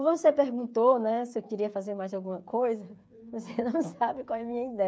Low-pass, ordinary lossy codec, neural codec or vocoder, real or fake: none; none; codec, 16 kHz, 8 kbps, FreqCodec, smaller model; fake